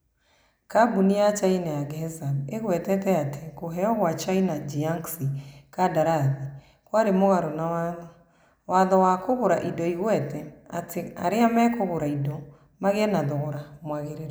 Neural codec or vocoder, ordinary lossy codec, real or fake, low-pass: none; none; real; none